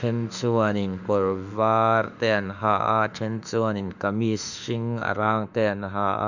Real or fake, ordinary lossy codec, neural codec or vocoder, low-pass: fake; none; autoencoder, 48 kHz, 32 numbers a frame, DAC-VAE, trained on Japanese speech; 7.2 kHz